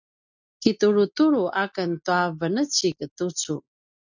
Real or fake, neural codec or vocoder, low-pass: real; none; 7.2 kHz